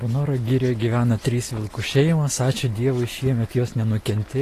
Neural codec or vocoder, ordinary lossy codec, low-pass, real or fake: none; AAC, 48 kbps; 14.4 kHz; real